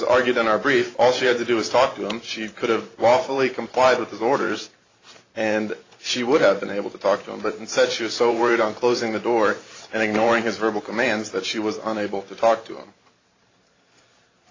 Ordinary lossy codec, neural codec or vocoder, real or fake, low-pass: AAC, 32 kbps; vocoder, 44.1 kHz, 128 mel bands every 512 samples, BigVGAN v2; fake; 7.2 kHz